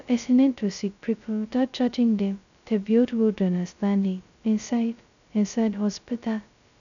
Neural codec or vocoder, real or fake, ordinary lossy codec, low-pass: codec, 16 kHz, 0.2 kbps, FocalCodec; fake; none; 7.2 kHz